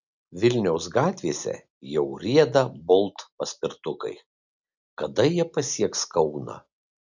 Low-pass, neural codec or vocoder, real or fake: 7.2 kHz; none; real